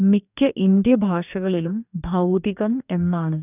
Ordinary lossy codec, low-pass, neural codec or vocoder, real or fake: none; 3.6 kHz; codec, 44.1 kHz, 2.6 kbps, DAC; fake